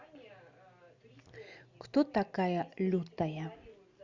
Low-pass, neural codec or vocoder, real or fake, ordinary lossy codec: 7.2 kHz; none; real; Opus, 64 kbps